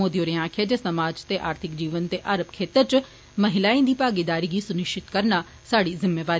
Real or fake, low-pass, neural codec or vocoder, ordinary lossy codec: real; none; none; none